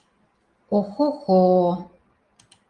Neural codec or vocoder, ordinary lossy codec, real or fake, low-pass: none; Opus, 24 kbps; real; 10.8 kHz